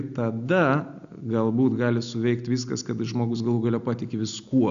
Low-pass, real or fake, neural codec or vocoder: 7.2 kHz; real; none